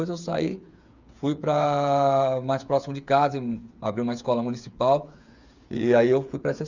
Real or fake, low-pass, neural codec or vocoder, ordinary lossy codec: fake; 7.2 kHz; codec, 16 kHz, 8 kbps, FreqCodec, smaller model; Opus, 64 kbps